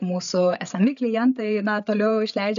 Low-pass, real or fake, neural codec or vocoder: 7.2 kHz; fake; codec, 16 kHz, 8 kbps, FreqCodec, larger model